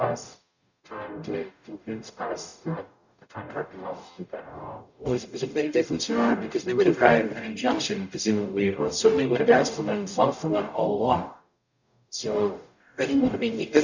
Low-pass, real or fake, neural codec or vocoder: 7.2 kHz; fake; codec, 44.1 kHz, 0.9 kbps, DAC